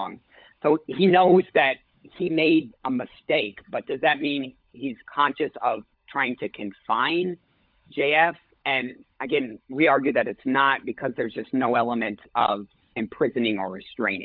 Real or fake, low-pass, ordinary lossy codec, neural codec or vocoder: fake; 5.4 kHz; MP3, 48 kbps; codec, 16 kHz, 16 kbps, FunCodec, trained on LibriTTS, 50 frames a second